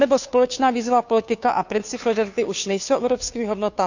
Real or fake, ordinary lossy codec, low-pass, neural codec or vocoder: fake; none; 7.2 kHz; codec, 16 kHz, 2 kbps, FunCodec, trained on Chinese and English, 25 frames a second